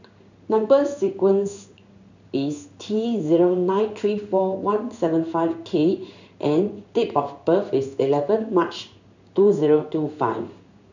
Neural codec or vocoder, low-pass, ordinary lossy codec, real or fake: codec, 16 kHz in and 24 kHz out, 1 kbps, XY-Tokenizer; 7.2 kHz; none; fake